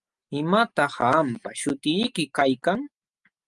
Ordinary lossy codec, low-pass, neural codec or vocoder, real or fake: Opus, 32 kbps; 10.8 kHz; none; real